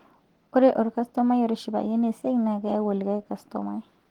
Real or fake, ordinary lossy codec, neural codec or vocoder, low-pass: real; Opus, 16 kbps; none; 19.8 kHz